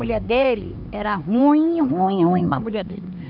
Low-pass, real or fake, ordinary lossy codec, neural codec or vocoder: 5.4 kHz; fake; none; codec, 16 kHz, 4 kbps, X-Codec, HuBERT features, trained on LibriSpeech